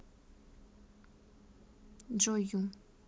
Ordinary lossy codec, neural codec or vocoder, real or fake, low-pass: none; none; real; none